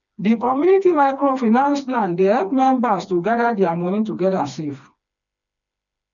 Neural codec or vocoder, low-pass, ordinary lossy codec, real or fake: codec, 16 kHz, 2 kbps, FreqCodec, smaller model; 7.2 kHz; none; fake